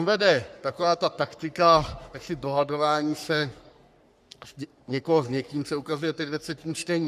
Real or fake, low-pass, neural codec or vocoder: fake; 14.4 kHz; codec, 44.1 kHz, 3.4 kbps, Pupu-Codec